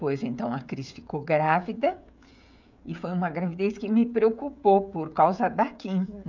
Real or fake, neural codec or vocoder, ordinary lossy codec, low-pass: fake; codec, 16 kHz, 16 kbps, FreqCodec, smaller model; none; 7.2 kHz